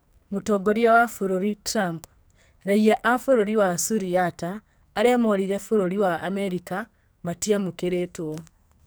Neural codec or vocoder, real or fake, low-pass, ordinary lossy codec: codec, 44.1 kHz, 2.6 kbps, SNAC; fake; none; none